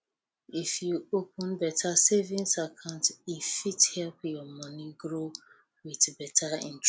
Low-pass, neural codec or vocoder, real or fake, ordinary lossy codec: none; none; real; none